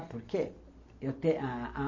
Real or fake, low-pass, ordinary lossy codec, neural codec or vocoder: real; 7.2 kHz; MP3, 32 kbps; none